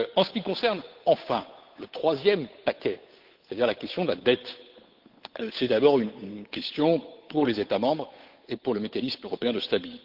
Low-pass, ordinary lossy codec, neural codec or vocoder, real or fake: 5.4 kHz; Opus, 32 kbps; codec, 16 kHz, 8 kbps, FunCodec, trained on Chinese and English, 25 frames a second; fake